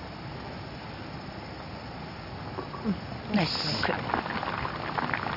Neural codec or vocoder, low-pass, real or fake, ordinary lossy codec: none; 5.4 kHz; real; none